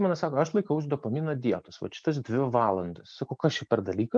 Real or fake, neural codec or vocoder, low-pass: real; none; 9.9 kHz